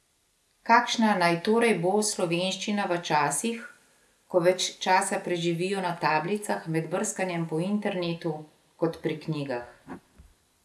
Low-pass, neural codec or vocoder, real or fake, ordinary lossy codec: none; none; real; none